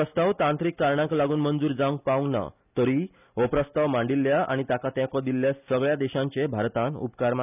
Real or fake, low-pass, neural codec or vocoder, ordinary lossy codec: real; 3.6 kHz; none; none